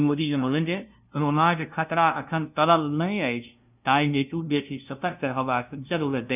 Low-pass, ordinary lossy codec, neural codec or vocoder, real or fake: 3.6 kHz; none; codec, 16 kHz, 0.5 kbps, FunCodec, trained on LibriTTS, 25 frames a second; fake